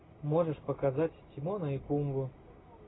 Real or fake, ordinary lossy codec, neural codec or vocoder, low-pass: real; AAC, 16 kbps; none; 7.2 kHz